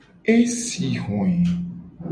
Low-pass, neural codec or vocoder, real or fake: 9.9 kHz; none; real